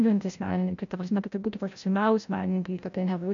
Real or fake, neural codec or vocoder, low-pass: fake; codec, 16 kHz, 0.5 kbps, FreqCodec, larger model; 7.2 kHz